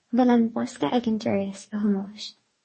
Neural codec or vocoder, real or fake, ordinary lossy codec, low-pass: codec, 44.1 kHz, 2.6 kbps, DAC; fake; MP3, 32 kbps; 10.8 kHz